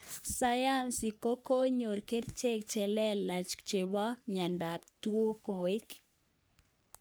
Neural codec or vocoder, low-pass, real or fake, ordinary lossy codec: codec, 44.1 kHz, 3.4 kbps, Pupu-Codec; none; fake; none